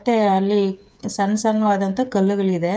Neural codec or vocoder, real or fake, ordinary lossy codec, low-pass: codec, 16 kHz, 8 kbps, FreqCodec, smaller model; fake; none; none